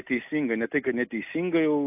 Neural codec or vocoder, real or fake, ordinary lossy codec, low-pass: none; real; AAC, 32 kbps; 3.6 kHz